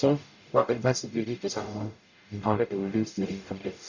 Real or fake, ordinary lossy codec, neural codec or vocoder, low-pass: fake; none; codec, 44.1 kHz, 0.9 kbps, DAC; 7.2 kHz